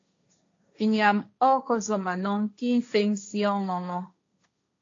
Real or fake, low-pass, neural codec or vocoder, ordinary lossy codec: fake; 7.2 kHz; codec, 16 kHz, 1.1 kbps, Voila-Tokenizer; AAC, 48 kbps